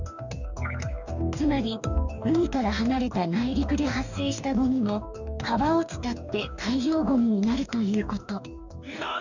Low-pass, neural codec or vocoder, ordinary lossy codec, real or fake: 7.2 kHz; codec, 44.1 kHz, 2.6 kbps, DAC; none; fake